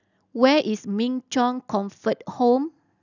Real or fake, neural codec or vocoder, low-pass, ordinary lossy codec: real; none; 7.2 kHz; none